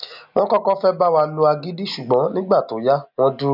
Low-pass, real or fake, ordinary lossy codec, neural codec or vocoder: 5.4 kHz; real; none; none